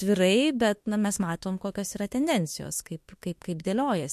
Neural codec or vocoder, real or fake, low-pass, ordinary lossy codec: autoencoder, 48 kHz, 32 numbers a frame, DAC-VAE, trained on Japanese speech; fake; 14.4 kHz; MP3, 64 kbps